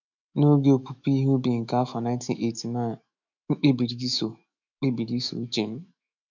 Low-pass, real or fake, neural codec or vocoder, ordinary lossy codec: 7.2 kHz; fake; autoencoder, 48 kHz, 128 numbers a frame, DAC-VAE, trained on Japanese speech; none